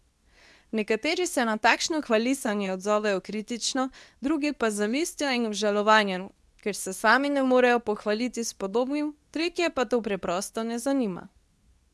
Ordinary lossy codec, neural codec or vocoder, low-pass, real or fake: none; codec, 24 kHz, 0.9 kbps, WavTokenizer, medium speech release version 2; none; fake